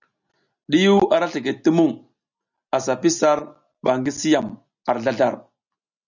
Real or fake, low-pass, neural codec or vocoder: real; 7.2 kHz; none